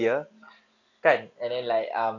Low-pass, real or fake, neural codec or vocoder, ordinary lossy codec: 7.2 kHz; real; none; none